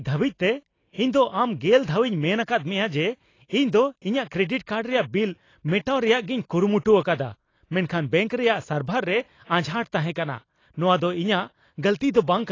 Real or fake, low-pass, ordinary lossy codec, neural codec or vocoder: real; 7.2 kHz; AAC, 32 kbps; none